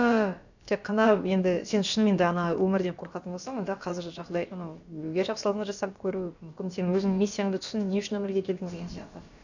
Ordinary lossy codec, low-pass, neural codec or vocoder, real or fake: none; 7.2 kHz; codec, 16 kHz, about 1 kbps, DyCAST, with the encoder's durations; fake